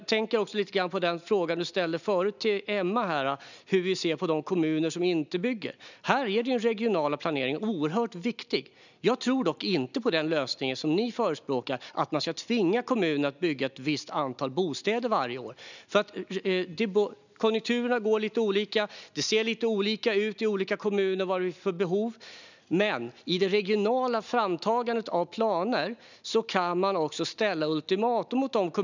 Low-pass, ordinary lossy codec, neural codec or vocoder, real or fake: 7.2 kHz; none; none; real